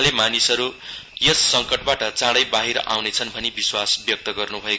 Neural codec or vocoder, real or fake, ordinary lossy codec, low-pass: none; real; none; none